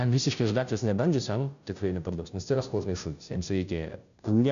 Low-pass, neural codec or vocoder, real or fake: 7.2 kHz; codec, 16 kHz, 0.5 kbps, FunCodec, trained on Chinese and English, 25 frames a second; fake